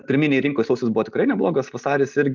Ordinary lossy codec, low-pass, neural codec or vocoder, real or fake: Opus, 32 kbps; 7.2 kHz; none; real